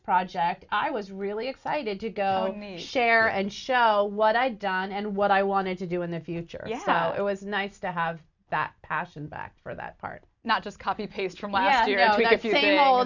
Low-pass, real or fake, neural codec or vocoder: 7.2 kHz; real; none